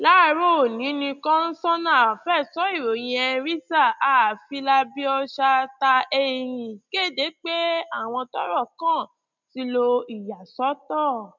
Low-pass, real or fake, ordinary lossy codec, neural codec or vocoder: 7.2 kHz; real; none; none